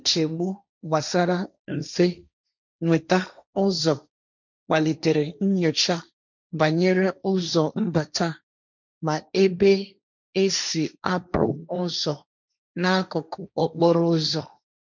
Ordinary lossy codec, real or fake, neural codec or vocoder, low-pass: none; fake; codec, 16 kHz, 1.1 kbps, Voila-Tokenizer; 7.2 kHz